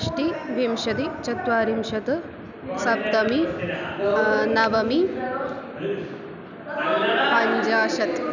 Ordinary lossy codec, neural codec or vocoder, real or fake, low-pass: none; none; real; 7.2 kHz